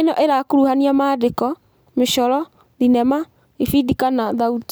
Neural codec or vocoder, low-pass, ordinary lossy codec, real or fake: none; none; none; real